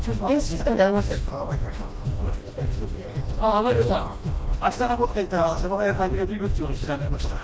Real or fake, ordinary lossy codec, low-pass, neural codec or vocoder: fake; none; none; codec, 16 kHz, 1 kbps, FreqCodec, smaller model